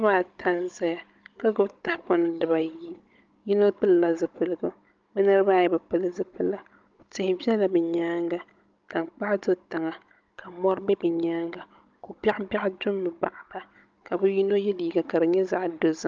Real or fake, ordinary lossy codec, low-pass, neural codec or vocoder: fake; Opus, 24 kbps; 7.2 kHz; codec, 16 kHz, 16 kbps, FunCodec, trained on Chinese and English, 50 frames a second